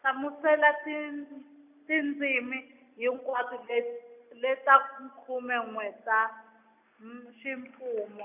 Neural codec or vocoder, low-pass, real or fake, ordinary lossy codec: none; 3.6 kHz; real; none